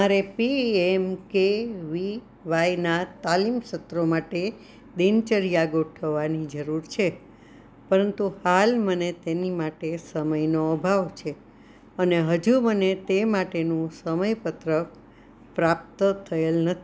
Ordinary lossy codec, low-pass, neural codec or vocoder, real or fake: none; none; none; real